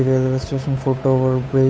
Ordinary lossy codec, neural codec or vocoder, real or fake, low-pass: none; codec, 16 kHz, 8 kbps, FunCodec, trained on Chinese and English, 25 frames a second; fake; none